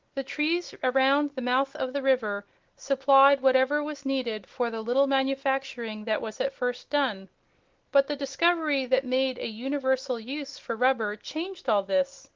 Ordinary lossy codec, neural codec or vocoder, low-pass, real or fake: Opus, 16 kbps; none; 7.2 kHz; real